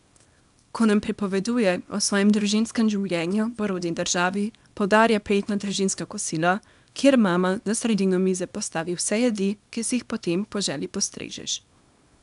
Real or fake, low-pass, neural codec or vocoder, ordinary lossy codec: fake; 10.8 kHz; codec, 24 kHz, 0.9 kbps, WavTokenizer, small release; none